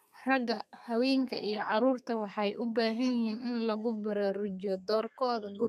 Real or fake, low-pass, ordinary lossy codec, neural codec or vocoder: fake; 14.4 kHz; none; codec, 32 kHz, 1.9 kbps, SNAC